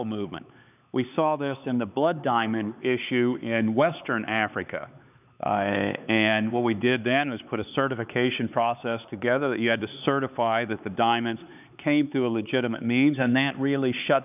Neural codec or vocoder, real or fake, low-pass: codec, 16 kHz, 4 kbps, X-Codec, HuBERT features, trained on LibriSpeech; fake; 3.6 kHz